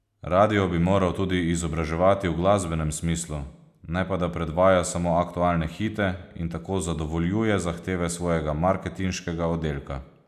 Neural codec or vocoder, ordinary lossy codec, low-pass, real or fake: none; none; 14.4 kHz; real